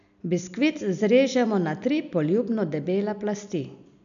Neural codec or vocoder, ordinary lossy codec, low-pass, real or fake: none; none; 7.2 kHz; real